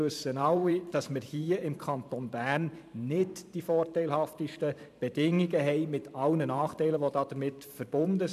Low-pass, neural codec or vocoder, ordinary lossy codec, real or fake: 14.4 kHz; vocoder, 44.1 kHz, 128 mel bands every 512 samples, BigVGAN v2; none; fake